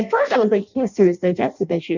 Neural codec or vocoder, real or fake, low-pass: codec, 16 kHz in and 24 kHz out, 0.6 kbps, FireRedTTS-2 codec; fake; 7.2 kHz